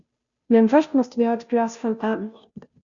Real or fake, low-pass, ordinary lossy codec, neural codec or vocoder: fake; 7.2 kHz; Opus, 64 kbps; codec, 16 kHz, 0.5 kbps, FunCodec, trained on Chinese and English, 25 frames a second